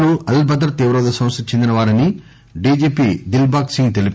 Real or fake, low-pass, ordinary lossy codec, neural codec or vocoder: real; none; none; none